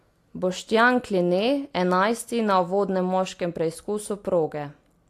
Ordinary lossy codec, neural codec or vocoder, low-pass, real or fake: AAC, 64 kbps; none; 14.4 kHz; real